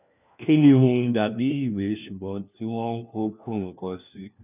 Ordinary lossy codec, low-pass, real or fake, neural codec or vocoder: none; 3.6 kHz; fake; codec, 16 kHz, 1 kbps, FunCodec, trained on LibriTTS, 50 frames a second